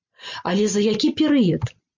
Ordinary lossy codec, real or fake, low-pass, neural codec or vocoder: MP3, 48 kbps; real; 7.2 kHz; none